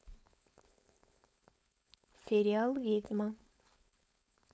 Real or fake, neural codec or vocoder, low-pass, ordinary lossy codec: fake; codec, 16 kHz, 4.8 kbps, FACodec; none; none